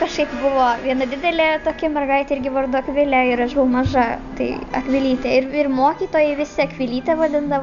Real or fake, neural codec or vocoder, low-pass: real; none; 7.2 kHz